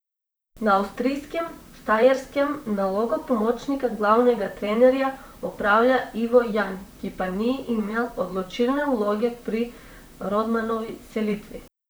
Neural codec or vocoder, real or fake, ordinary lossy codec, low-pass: vocoder, 44.1 kHz, 128 mel bands, Pupu-Vocoder; fake; none; none